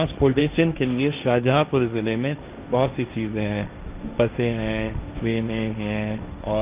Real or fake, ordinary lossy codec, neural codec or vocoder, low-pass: fake; Opus, 24 kbps; codec, 16 kHz, 1.1 kbps, Voila-Tokenizer; 3.6 kHz